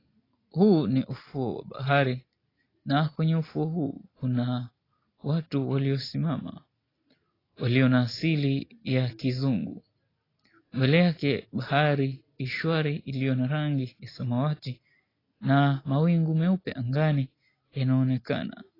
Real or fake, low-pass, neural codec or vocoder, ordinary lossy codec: real; 5.4 kHz; none; AAC, 24 kbps